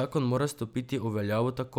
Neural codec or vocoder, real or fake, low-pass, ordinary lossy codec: none; real; none; none